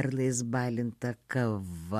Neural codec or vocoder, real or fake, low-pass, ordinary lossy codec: none; real; 14.4 kHz; MP3, 64 kbps